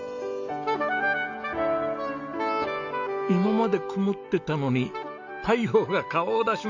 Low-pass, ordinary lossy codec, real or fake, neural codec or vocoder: 7.2 kHz; none; real; none